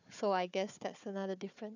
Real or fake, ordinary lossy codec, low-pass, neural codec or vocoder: fake; none; 7.2 kHz; codec, 16 kHz, 4 kbps, FunCodec, trained on Chinese and English, 50 frames a second